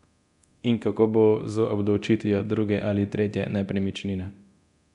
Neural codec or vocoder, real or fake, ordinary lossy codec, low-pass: codec, 24 kHz, 0.9 kbps, DualCodec; fake; none; 10.8 kHz